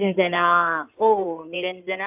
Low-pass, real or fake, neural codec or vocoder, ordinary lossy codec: 3.6 kHz; fake; codec, 16 kHz in and 24 kHz out, 2.2 kbps, FireRedTTS-2 codec; AAC, 32 kbps